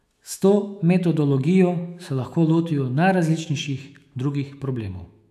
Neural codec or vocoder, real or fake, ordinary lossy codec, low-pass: autoencoder, 48 kHz, 128 numbers a frame, DAC-VAE, trained on Japanese speech; fake; none; 14.4 kHz